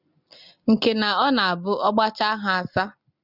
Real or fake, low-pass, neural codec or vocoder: real; 5.4 kHz; none